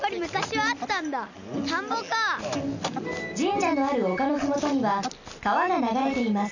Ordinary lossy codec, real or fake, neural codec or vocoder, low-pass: none; real; none; 7.2 kHz